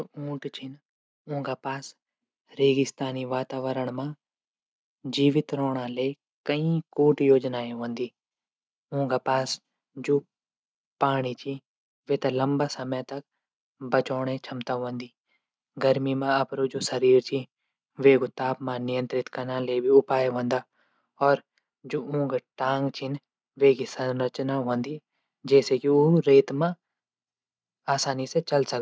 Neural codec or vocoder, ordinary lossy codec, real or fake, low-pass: none; none; real; none